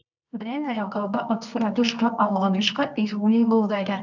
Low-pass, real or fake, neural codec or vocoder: 7.2 kHz; fake; codec, 24 kHz, 0.9 kbps, WavTokenizer, medium music audio release